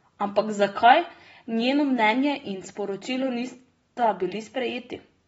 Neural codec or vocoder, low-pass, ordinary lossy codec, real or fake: none; 19.8 kHz; AAC, 24 kbps; real